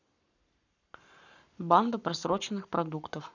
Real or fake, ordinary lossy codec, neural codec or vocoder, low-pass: fake; none; codec, 44.1 kHz, 7.8 kbps, Pupu-Codec; 7.2 kHz